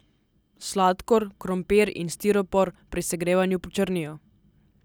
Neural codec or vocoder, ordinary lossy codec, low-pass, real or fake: none; none; none; real